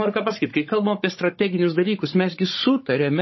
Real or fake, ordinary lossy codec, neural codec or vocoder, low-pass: fake; MP3, 24 kbps; codec, 16 kHz, 16 kbps, FunCodec, trained on Chinese and English, 50 frames a second; 7.2 kHz